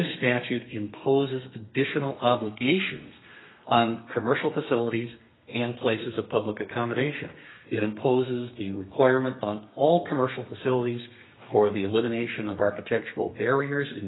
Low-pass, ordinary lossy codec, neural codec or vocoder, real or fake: 7.2 kHz; AAC, 16 kbps; codec, 32 kHz, 1.9 kbps, SNAC; fake